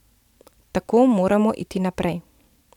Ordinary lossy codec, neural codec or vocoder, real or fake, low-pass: none; vocoder, 44.1 kHz, 128 mel bands every 512 samples, BigVGAN v2; fake; 19.8 kHz